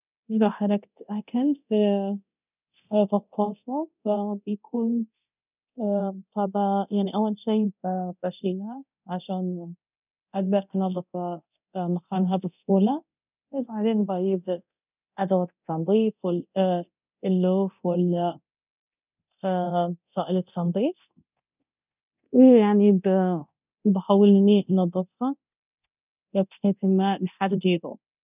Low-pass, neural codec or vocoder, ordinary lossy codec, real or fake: 3.6 kHz; codec, 24 kHz, 0.9 kbps, DualCodec; none; fake